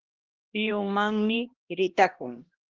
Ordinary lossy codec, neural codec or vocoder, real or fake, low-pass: Opus, 16 kbps; codec, 16 kHz, 1 kbps, X-Codec, HuBERT features, trained on LibriSpeech; fake; 7.2 kHz